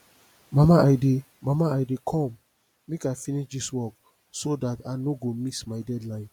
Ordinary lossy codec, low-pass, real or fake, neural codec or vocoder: none; 19.8 kHz; real; none